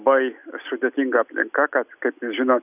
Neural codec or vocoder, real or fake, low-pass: none; real; 3.6 kHz